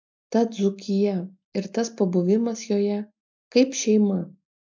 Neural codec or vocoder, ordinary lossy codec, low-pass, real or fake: none; MP3, 64 kbps; 7.2 kHz; real